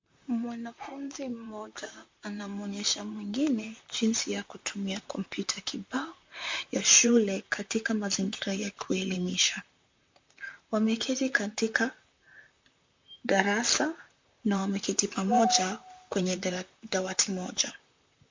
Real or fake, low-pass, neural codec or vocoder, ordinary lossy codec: fake; 7.2 kHz; vocoder, 44.1 kHz, 128 mel bands, Pupu-Vocoder; MP3, 48 kbps